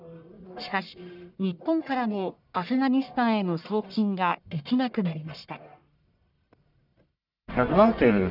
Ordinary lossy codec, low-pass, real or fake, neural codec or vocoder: none; 5.4 kHz; fake; codec, 44.1 kHz, 1.7 kbps, Pupu-Codec